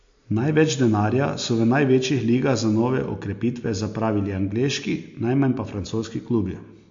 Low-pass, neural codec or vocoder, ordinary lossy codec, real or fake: 7.2 kHz; none; MP3, 48 kbps; real